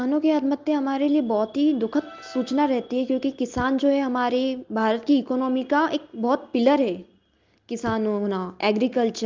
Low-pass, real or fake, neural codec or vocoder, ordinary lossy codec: 7.2 kHz; real; none; Opus, 32 kbps